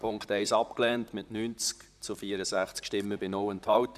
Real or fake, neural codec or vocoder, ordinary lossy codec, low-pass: fake; vocoder, 44.1 kHz, 128 mel bands, Pupu-Vocoder; none; 14.4 kHz